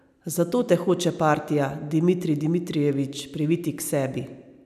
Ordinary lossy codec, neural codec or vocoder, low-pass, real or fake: none; none; 14.4 kHz; real